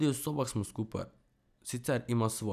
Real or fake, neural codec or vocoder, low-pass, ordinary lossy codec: real; none; 14.4 kHz; none